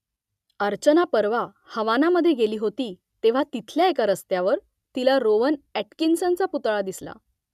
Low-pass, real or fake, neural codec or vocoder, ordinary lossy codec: 14.4 kHz; real; none; none